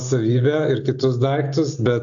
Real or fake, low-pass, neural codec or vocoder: real; 7.2 kHz; none